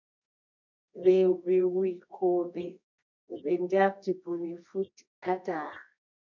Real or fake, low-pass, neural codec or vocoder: fake; 7.2 kHz; codec, 24 kHz, 0.9 kbps, WavTokenizer, medium music audio release